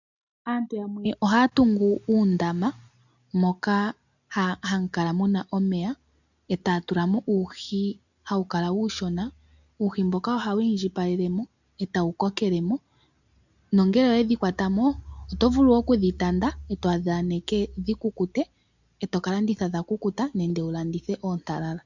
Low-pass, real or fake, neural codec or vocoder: 7.2 kHz; real; none